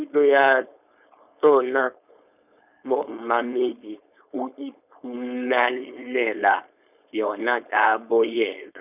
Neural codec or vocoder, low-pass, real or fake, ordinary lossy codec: codec, 16 kHz, 4.8 kbps, FACodec; 3.6 kHz; fake; none